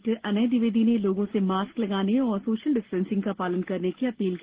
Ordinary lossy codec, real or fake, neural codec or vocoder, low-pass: Opus, 16 kbps; real; none; 3.6 kHz